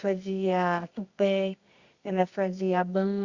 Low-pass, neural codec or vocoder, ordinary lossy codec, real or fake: 7.2 kHz; codec, 24 kHz, 0.9 kbps, WavTokenizer, medium music audio release; Opus, 64 kbps; fake